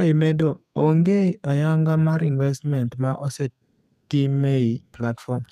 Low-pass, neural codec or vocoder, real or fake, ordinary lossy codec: 14.4 kHz; codec, 32 kHz, 1.9 kbps, SNAC; fake; none